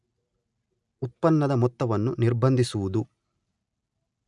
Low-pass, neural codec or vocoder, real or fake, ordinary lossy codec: 10.8 kHz; none; real; none